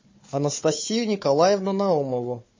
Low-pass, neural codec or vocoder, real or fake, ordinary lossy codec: 7.2 kHz; codec, 16 kHz, 4 kbps, FunCodec, trained on Chinese and English, 50 frames a second; fake; MP3, 32 kbps